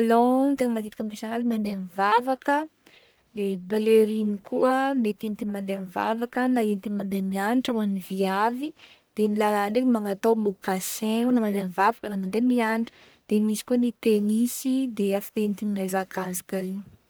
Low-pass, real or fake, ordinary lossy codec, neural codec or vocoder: none; fake; none; codec, 44.1 kHz, 1.7 kbps, Pupu-Codec